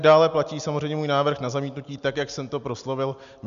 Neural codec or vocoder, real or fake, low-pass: none; real; 7.2 kHz